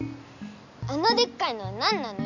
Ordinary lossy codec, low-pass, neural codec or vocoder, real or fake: none; 7.2 kHz; none; real